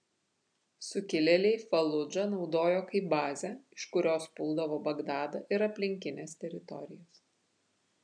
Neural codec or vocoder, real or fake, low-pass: none; real; 9.9 kHz